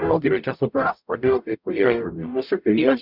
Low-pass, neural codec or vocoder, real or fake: 5.4 kHz; codec, 44.1 kHz, 0.9 kbps, DAC; fake